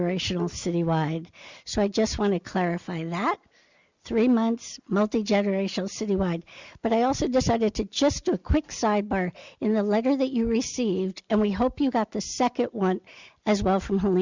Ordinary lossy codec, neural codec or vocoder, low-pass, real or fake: Opus, 64 kbps; none; 7.2 kHz; real